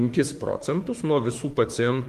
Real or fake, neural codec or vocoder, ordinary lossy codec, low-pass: fake; autoencoder, 48 kHz, 32 numbers a frame, DAC-VAE, trained on Japanese speech; Opus, 24 kbps; 14.4 kHz